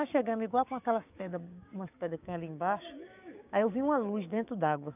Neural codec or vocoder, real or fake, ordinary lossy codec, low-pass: codec, 16 kHz, 6 kbps, DAC; fake; none; 3.6 kHz